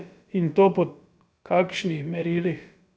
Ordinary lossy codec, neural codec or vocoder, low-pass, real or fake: none; codec, 16 kHz, about 1 kbps, DyCAST, with the encoder's durations; none; fake